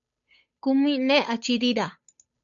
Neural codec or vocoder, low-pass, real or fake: codec, 16 kHz, 8 kbps, FunCodec, trained on Chinese and English, 25 frames a second; 7.2 kHz; fake